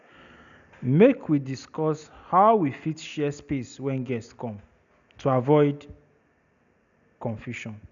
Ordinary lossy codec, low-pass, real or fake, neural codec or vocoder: none; 7.2 kHz; real; none